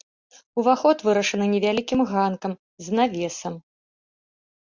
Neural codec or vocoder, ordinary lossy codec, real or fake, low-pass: none; Opus, 64 kbps; real; 7.2 kHz